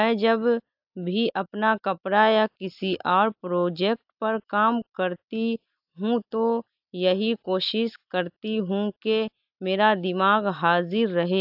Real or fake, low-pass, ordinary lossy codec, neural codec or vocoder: real; 5.4 kHz; AAC, 48 kbps; none